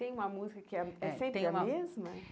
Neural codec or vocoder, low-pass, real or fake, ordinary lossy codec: none; none; real; none